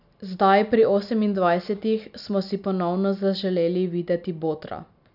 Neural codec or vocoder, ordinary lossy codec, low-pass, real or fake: none; none; 5.4 kHz; real